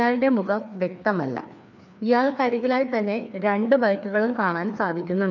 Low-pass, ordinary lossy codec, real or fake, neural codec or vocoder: 7.2 kHz; none; fake; codec, 16 kHz, 2 kbps, FreqCodec, larger model